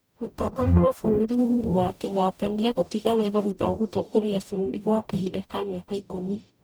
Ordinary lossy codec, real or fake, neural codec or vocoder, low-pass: none; fake; codec, 44.1 kHz, 0.9 kbps, DAC; none